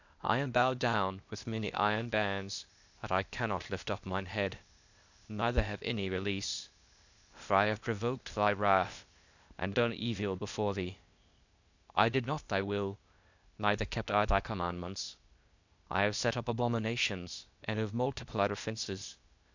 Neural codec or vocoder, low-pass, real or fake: codec, 16 kHz, 0.8 kbps, ZipCodec; 7.2 kHz; fake